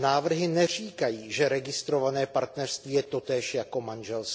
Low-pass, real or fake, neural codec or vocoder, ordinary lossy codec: none; real; none; none